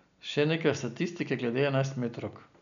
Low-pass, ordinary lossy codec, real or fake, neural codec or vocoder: 7.2 kHz; none; real; none